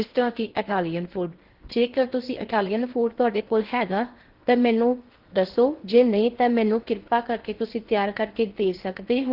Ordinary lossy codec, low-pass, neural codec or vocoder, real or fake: Opus, 16 kbps; 5.4 kHz; codec, 16 kHz in and 24 kHz out, 0.8 kbps, FocalCodec, streaming, 65536 codes; fake